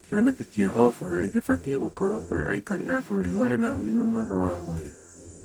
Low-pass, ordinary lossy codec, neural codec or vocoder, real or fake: none; none; codec, 44.1 kHz, 0.9 kbps, DAC; fake